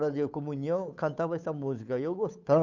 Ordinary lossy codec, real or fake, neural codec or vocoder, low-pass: none; fake; codec, 16 kHz, 8 kbps, FunCodec, trained on Chinese and English, 25 frames a second; 7.2 kHz